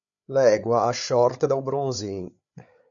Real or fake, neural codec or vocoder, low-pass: fake; codec, 16 kHz, 8 kbps, FreqCodec, larger model; 7.2 kHz